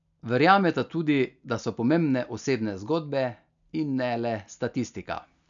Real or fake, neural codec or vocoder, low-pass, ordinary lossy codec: real; none; 7.2 kHz; none